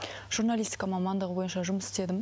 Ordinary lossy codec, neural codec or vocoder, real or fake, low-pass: none; none; real; none